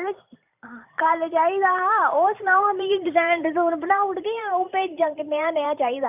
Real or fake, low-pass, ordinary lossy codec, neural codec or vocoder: fake; 3.6 kHz; none; vocoder, 44.1 kHz, 128 mel bands every 512 samples, BigVGAN v2